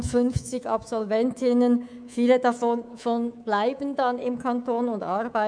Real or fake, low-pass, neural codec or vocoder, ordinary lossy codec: fake; 9.9 kHz; codec, 24 kHz, 3.1 kbps, DualCodec; none